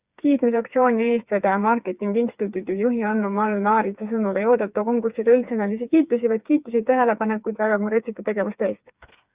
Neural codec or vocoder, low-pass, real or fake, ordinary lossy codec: codec, 16 kHz, 4 kbps, FreqCodec, smaller model; 3.6 kHz; fake; Opus, 64 kbps